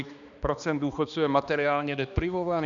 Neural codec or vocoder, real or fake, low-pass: codec, 16 kHz, 2 kbps, X-Codec, HuBERT features, trained on balanced general audio; fake; 7.2 kHz